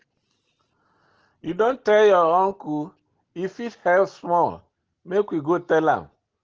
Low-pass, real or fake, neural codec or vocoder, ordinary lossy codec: 7.2 kHz; real; none; Opus, 16 kbps